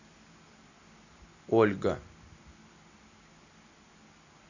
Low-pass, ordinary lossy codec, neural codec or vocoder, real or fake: 7.2 kHz; none; none; real